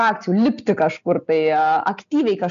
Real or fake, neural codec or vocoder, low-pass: real; none; 7.2 kHz